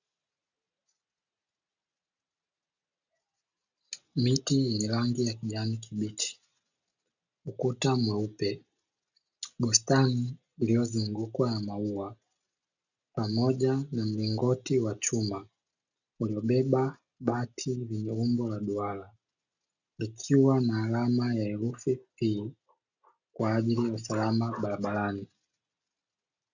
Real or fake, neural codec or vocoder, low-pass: real; none; 7.2 kHz